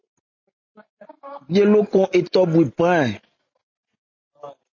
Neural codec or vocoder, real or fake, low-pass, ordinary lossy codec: none; real; 7.2 kHz; MP3, 32 kbps